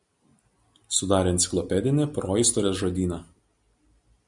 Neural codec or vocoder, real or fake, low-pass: none; real; 10.8 kHz